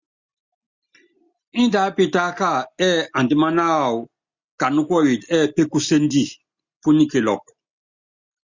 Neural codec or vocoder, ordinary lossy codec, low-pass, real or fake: none; Opus, 64 kbps; 7.2 kHz; real